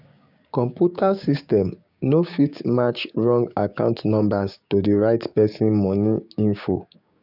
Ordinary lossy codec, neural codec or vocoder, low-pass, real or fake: none; codec, 16 kHz, 6 kbps, DAC; 5.4 kHz; fake